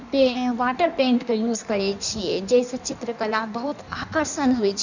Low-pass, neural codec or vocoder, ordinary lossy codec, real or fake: 7.2 kHz; codec, 16 kHz in and 24 kHz out, 1.1 kbps, FireRedTTS-2 codec; none; fake